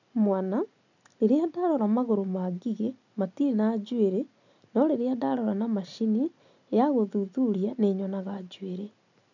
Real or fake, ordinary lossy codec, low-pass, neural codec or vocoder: real; none; 7.2 kHz; none